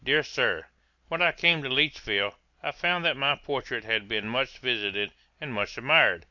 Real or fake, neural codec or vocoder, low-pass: real; none; 7.2 kHz